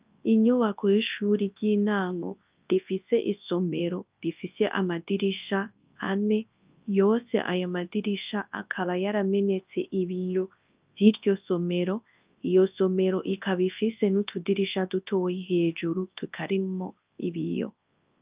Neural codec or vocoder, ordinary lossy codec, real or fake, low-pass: codec, 24 kHz, 0.9 kbps, WavTokenizer, large speech release; Opus, 24 kbps; fake; 3.6 kHz